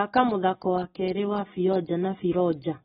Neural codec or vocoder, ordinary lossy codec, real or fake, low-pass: none; AAC, 16 kbps; real; 19.8 kHz